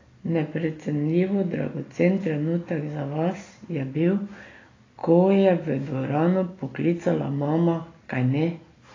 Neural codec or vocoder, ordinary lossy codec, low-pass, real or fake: none; AAC, 32 kbps; 7.2 kHz; real